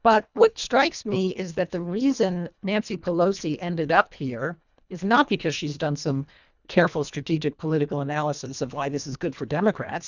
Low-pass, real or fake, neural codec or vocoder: 7.2 kHz; fake; codec, 24 kHz, 1.5 kbps, HILCodec